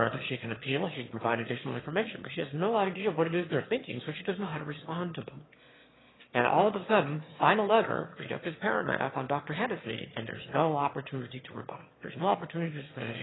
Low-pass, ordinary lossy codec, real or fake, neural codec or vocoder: 7.2 kHz; AAC, 16 kbps; fake; autoencoder, 22.05 kHz, a latent of 192 numbers a frame, VITS, trained on one speaker